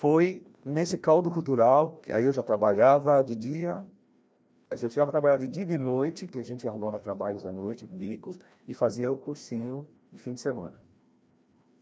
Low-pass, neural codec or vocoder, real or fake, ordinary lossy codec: none; codec, 16 kHz, 1 kbps, FreqCodec, larger model; fake; none